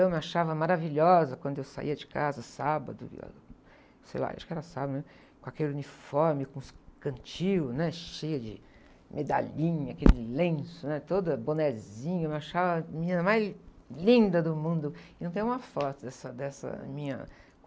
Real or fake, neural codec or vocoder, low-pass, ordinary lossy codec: real; none; none; none